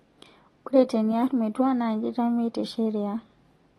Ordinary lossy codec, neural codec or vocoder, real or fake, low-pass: AAC, 32 kbps; none; real; 19.8 kHz